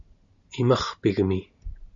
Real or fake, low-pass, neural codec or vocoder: real; 7.2 kHz; none